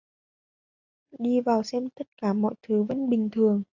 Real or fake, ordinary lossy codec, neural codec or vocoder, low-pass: real; AAC, 48 kbps; none; 7.2 kHz